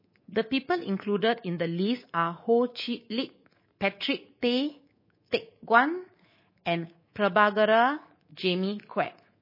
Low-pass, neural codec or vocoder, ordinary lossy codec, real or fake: 5.4 kHz; codec, 24 kHz, 3.1 kbps, DualCodec; MP3, 24 kbps; fake